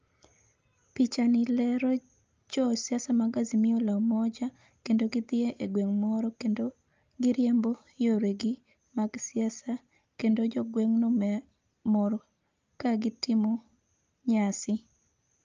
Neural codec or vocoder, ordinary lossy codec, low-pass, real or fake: none; Opus, 24 kbps; 7.2 kHz; real